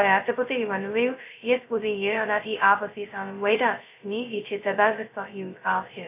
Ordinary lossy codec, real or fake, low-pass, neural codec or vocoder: AAC, 32 kbps; fake; 3.6 kHz; codec, 16 kHz, 0.2 kbps, FocalCodec